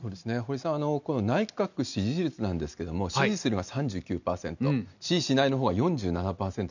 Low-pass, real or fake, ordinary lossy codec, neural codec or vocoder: 7.2 kHz; real; none; none